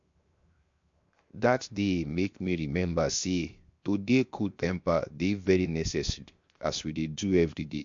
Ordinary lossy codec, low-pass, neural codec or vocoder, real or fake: MP3, 48 kbps; 7.2 kHz; codec, 16 kHz, 0.7 kbps, FocalCodec; fake